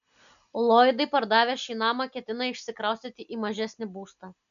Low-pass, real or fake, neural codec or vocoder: 7.2 kHz; real; none